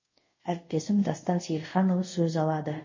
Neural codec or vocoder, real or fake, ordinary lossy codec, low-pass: codec, 24 kHz, 0.5 kbps, DualCodec; fake; MP3, 32 kbps; 7.2 kHz